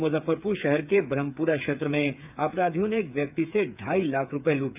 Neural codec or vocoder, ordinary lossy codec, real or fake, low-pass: codec, 16 kHz, 8 kbps, FreqCodec, smaller model; none; fake; 3.6 kHz